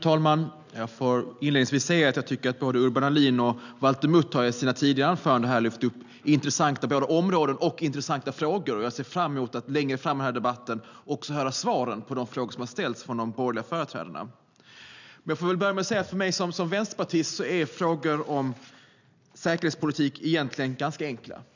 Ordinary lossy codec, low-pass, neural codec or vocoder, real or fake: none; 7.2 kHz; none; real